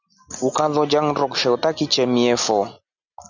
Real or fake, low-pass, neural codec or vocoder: real; 7.2 kHz; none